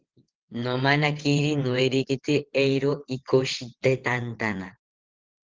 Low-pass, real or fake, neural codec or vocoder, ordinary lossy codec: 7.2 kHz; fake; vocoder, 22.05 kHz, 80 mel bands, WaveNeXt; Opus, 16 kbps